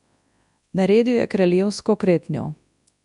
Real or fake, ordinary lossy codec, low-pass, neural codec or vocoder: fake; none; 10.8 kHz; codec, 24 kHz, 0.9 kbps, WavTokenizer, large speech release